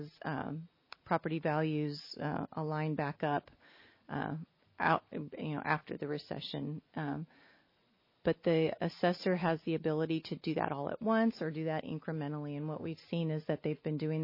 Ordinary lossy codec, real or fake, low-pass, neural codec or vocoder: MP3, 24 kbps; real; 5.4 kHz; none